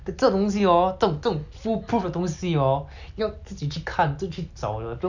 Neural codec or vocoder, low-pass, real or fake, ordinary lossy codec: none; 7.2 kHz; real; none